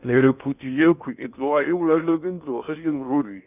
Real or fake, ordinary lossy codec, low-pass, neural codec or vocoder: fake; none; 3.6 kHz; codec, 16 kHz in and 24 kHz out, 0.6 kbps, FocalCodec, streaming, 2048 codes